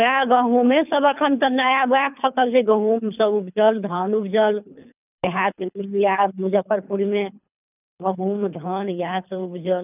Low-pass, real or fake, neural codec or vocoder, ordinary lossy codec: 3.6 kHz; fake; codec, 24 kHz, 3 kbps, HILCodec; none